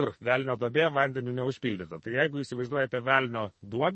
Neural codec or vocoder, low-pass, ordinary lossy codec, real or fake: codec, 44.1 kHz, 2.6 kbps, SNAC; 10.8 kHz; MP3, 32 kbps; fake